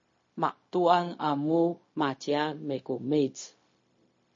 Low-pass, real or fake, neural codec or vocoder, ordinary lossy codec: 7.2 kHz; fake; codec, 16 kHz, 0.4 kbps, LongCat-Audio-Codec; MP3, 32 kbps